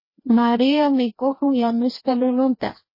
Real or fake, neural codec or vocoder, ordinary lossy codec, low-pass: fake; codec, 16 kHz, 1 kbps, FreqCodec, larger model; MP3, 24 kbps; 5.4 kHz